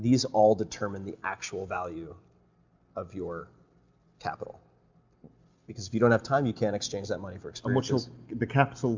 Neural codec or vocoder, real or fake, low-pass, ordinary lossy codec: none; real; 7.2 kHz; MP3, 64 kbps